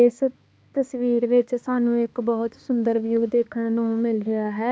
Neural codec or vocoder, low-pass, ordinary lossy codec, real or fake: codec, 16 kHz, 2 kbps, X-Codec, HuBERT features, trained on balanced general audio; none; none; fake